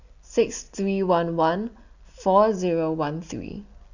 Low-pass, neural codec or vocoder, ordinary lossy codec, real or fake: 7.2 kHz; none; none; real